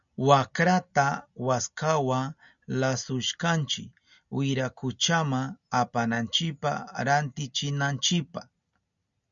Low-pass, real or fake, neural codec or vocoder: 7.2 kHz; real; none